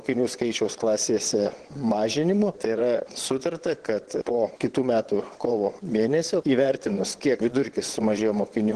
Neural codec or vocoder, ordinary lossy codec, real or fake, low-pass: vocoder, 22.05 kHz, 80 mel bands, WaveNeXt; Opus, 16 kbps; fake; 9.9 kHz